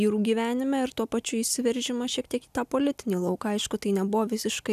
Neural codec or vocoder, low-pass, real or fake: none; 14.4 kHz; real